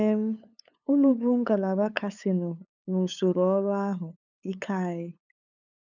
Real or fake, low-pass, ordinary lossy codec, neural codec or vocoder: fake; 7.2 kHz; none; codec, 16 kHz, 8 kbps, FunCodec, trained on LibriTTS, 25 frames a second